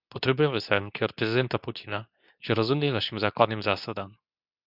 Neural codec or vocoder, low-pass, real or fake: codec, 24 kHz, 0.9 kbps, WavTokenizer, medium speech release version 2; 5.4 kHz; fake